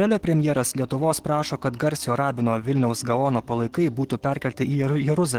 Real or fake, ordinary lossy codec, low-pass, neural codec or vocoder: fake; Opus, 16 kbps; 19.8 kHz; codec, 44.1 kHz, 7.8 kbps, DAC